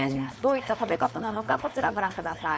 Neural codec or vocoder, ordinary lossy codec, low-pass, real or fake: codec, 16 kHz, 4.8 kbps, FACodec; none; none; fake